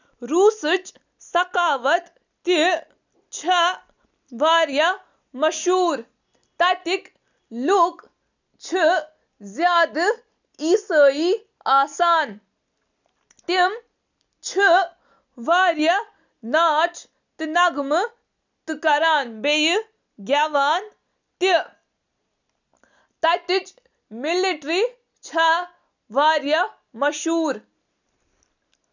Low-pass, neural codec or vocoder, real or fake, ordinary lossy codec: 7.2 kHz; none; real; none